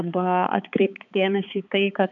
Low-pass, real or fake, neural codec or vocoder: 7.2 kHz; fake; codec, 16 kHz, 4 kbps, X-Codec, HuBERT features, trained on balanced general audio